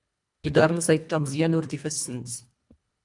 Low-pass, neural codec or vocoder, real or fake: 10.8 kHz; codec, 24 kHz, 1.5 kbps, HILCodec; fake